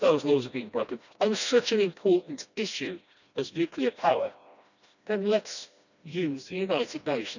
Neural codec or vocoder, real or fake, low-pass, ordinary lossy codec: codec, 16 kHz, 1 kbps, FreqCodec, smaller model; fake; 7.2 kHz; none